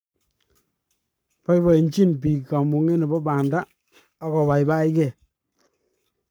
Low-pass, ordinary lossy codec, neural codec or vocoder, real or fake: none; none; codec, 44.1 kHz, 7.8 kbps, Pupu-Codec; fake